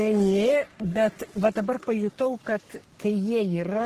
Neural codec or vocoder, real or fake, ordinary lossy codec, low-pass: codec, 44.1 kHz, 3.4 kbps, Pupu-Codec; fake; Opus, 16 kbps; 14.4 kHz